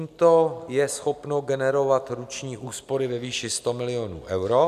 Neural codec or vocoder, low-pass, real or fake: none; 14.4 kHz; real